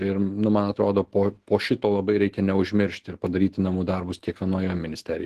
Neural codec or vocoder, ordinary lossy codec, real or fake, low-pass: autoencoder, 48 kHz, 128 numbers a frame, DAC-VAE, trained on Japanese speech; Opus, 16 kbps; fake; 14.4 kHz